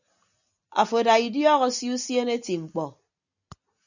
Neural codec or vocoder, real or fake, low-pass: none; real; 7.2 kHz